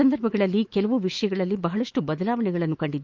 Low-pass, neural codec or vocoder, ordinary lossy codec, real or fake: 7.2 kHz; autoencoder, 48 kHz, 128 numbers a frame, DAC-VAE, trained on Japanese speech; Opus, 32 kbps; fake